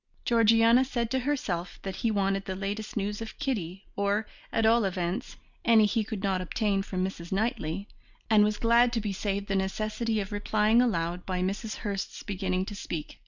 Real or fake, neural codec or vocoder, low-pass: real; none; 7.2 kHz